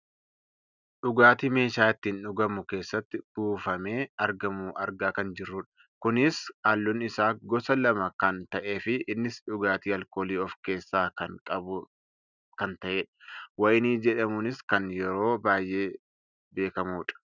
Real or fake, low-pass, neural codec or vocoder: real; 7.2 kHz; none